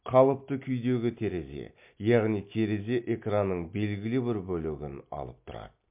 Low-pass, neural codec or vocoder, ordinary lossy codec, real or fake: 3.6 kHz; none; MP3, 32 kbps; real